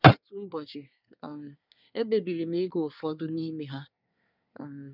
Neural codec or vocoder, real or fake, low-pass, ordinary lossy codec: codec, 24 kHz, 1 kbps, SNAC; fake; 5.4 kHz; none